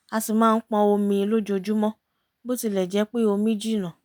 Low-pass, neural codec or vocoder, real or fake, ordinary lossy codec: none; none; real; none